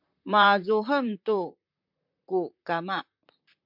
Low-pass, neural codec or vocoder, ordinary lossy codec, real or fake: 5.4 kHz; vocoder, 44.1 kHz, 128 mel bands, Pupu-Vocoder; MP3, 48 kbps; fake